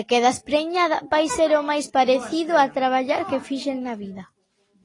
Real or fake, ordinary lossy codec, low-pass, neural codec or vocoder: real; AAC, 32 kbps; 10.8 kHz; none